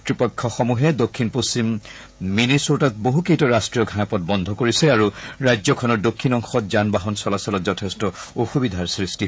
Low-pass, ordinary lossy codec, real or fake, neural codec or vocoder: none; none; fake; codec, 16 kHz, 16 kbps, FreqCodec, smaller model